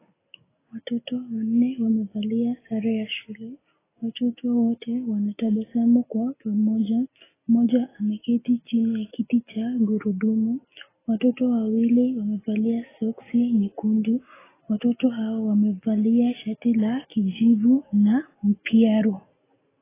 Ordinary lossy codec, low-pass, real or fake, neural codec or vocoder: AAC, 16 kbps; 3.6 kHz; real; none